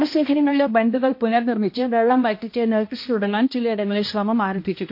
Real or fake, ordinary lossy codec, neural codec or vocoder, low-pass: fake; MP3, 32 kbps; codec, 16 kHz, 1 kbps, X-Codec, HuBERT features, trained on balanced general audio; 5.4 kHz